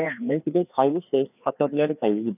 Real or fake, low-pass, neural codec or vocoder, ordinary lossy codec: fake; 3.6 kHz; codec, 16 kHz, 2 kbps, FreqCodec, larger model; none